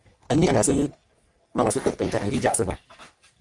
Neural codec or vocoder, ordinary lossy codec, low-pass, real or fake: codec, 44.1 kHz, 3.4 kbps, Pupu-Codec; Opus, 32 kbps; 10.8 kHz; fake